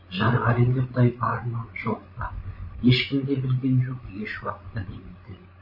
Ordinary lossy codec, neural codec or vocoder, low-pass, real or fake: MP3, 24 kbps; codec, 16 kHz, 16 kbps, FreqCodec, larger model; 5.4 kHz; fake